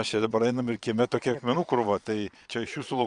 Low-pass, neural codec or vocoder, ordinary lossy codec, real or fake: 9.9 kHz; vocoder, 22.05 kHz, 80 mel bands, Vocos; Opus, 64 kbps; fake